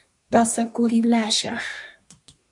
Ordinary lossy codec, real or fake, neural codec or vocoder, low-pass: AAC, 64 kbps; fake; codec, 24 kHz, 1 kbps, SNAC; 10.8 kHz